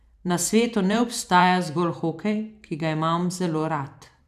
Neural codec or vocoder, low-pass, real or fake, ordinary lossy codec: vocoder, 44.1 kHz, 128 mel bands every 512 samples, BigVGAN v2; 14.4 kHz; fake; none